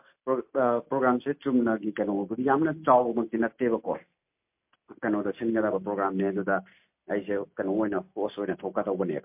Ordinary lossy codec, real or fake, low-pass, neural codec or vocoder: MP3, 32 kbps; real; 3.6 kHz; none